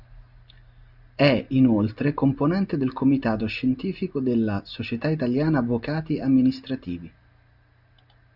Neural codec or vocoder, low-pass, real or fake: none; 5.4 kHz; real